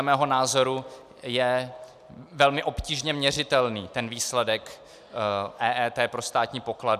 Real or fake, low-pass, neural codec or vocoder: real; 14.4 kHz; none